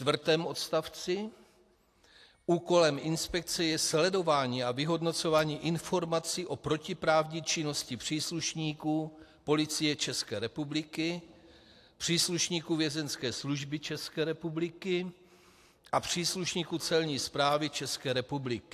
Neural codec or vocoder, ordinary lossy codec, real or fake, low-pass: none; AAC, 64 kbps; real; 14.4 kHz